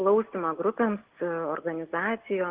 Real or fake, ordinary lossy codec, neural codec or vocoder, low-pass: real; Opus, 16 kbps; none; 3.6 kHz